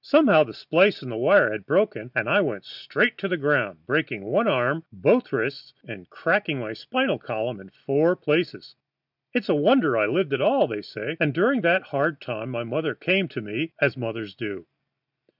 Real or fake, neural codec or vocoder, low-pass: real; none; 5.4 kHz